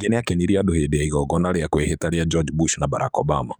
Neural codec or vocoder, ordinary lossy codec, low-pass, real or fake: codec, 44.1 kHz, 7.8 kbps, DAC; none; none; fake